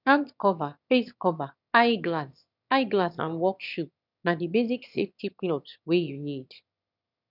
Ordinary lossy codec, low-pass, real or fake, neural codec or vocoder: none; 5.4 kHz; fake; autoencoder, 22.05 kHz, a latent of 192 numbers a frame, VITS, trained on one speaker